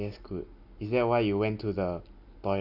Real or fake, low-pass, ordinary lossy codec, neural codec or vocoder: real; 5.4 kHz; none; none